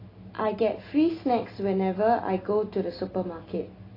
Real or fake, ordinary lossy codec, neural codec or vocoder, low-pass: real; AAC, 24 kbps; none; 5.4 kHz